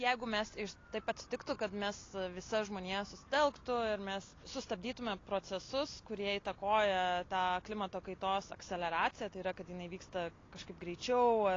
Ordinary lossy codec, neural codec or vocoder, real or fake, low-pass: AAC, 32 kbps; none; real; 7.2 kHz